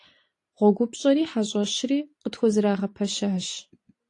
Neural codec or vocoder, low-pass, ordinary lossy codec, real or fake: none; 10.8 kHz; AAC, 48 kbps; real